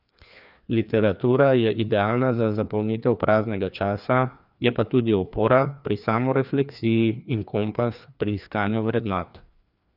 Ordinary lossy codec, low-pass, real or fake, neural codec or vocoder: none; 5.4 kHz; fake; codec, 16 kHz, 2 kbps, FreqCodec, larger model